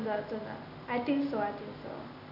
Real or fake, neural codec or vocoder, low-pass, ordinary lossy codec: real; none; 5.4 kHz; none